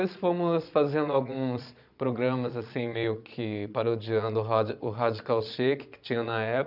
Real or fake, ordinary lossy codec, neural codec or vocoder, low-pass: fake; none; vocoder, 22.05 kHz, 80 mel bands, Vocos; 5.4 kHz